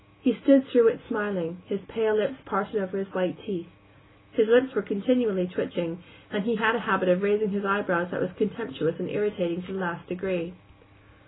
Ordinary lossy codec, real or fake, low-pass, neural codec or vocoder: AAC, 16 kbps; real; 7.2 kHz; none